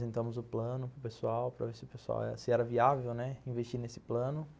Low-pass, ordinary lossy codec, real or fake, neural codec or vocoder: none; none; real; none